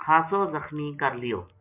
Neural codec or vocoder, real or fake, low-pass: none; real; 3.6 kHz